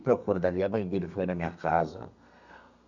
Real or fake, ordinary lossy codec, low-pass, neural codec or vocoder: fake; none; 7.2 kHz; codec, 32 kHz, 1.9 kbps, SNAC